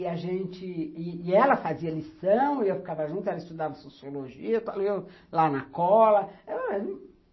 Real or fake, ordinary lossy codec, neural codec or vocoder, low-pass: real; MP3, 24 kbps; none; 7.2 kHz